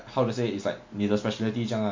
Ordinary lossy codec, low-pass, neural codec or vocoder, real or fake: MP3, 48 kbps; 7.2 kHz; none; real